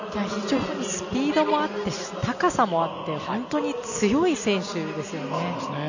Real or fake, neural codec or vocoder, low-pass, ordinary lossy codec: real; none; 7.2 kHz; none